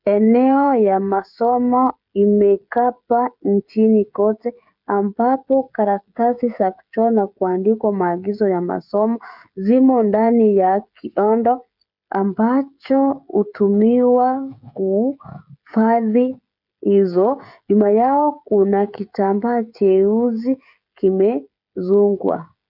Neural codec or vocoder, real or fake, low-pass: codec, 16 kHz, 16 kbps, FreqCodec, smaller model; fake; 5.4 kHz